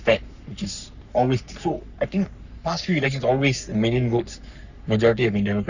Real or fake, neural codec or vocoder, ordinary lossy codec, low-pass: fake; codec, 44.1 kHz, 3.4 kbps, Pupu-Codec; none; 7.2 kHz